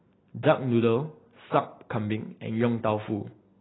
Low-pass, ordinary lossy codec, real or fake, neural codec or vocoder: 7.2 kHz; AAC, 16 kbps; real; none